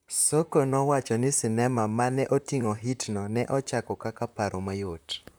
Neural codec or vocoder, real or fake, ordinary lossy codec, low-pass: vocoder, 44.1 kHz, 128 mel bands, Pupu-Vocoder; fake; none; none